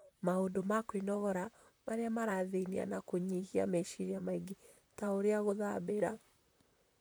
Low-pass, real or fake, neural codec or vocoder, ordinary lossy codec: none; fake; vocoder, 44.1 kHz, 128 mel bands, Pupu-Vocoder; none